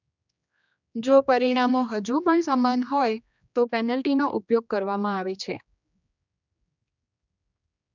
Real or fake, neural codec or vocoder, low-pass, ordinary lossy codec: fake; codec, 16 kHz, 2 kbps, X-Codec, HuBERT features, trained on general audio; 7.2 kHz; none